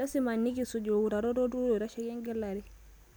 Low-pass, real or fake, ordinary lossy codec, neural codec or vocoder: none; real; none; none